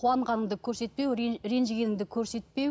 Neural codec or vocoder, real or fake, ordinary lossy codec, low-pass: none; real; none; none